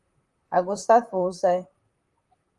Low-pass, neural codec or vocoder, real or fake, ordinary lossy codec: 10.8 kHz; vocoder, 44.1 kHz, 128 mel bands, Pupu-Vocoder; fake; Opus, 32 kbps